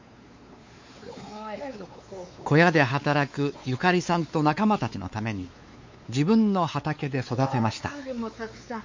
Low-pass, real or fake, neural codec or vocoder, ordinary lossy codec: 7.2 kHz; fake; codec, 16 kHz, 4 kbps, X-Codec, WavLM features, trained on Multilingual LibriSpeech; MP3, 48 kbps